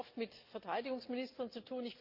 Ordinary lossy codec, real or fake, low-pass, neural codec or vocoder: Opus, 32 kbps; real; 5.4 kHz; none